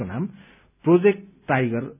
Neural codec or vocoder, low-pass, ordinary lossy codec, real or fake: none; 3.6 kHz; none; real